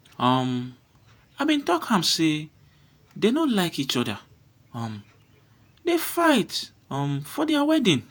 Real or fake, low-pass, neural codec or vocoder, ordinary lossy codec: fake; none; vocoder, 48 kHz, 128 mel bands, Vocos; none